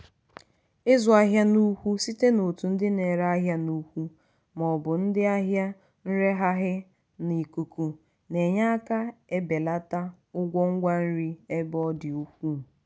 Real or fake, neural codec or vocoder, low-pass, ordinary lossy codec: real; none; none; none